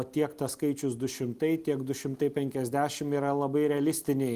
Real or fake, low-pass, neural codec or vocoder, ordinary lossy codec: real; 14.4 kHz; none; Opus, 24 kbps